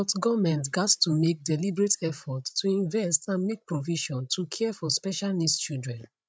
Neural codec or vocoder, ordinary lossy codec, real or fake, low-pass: codec, 16 kHz, 16 kbps, FreqCodec, larger model; none; fake; none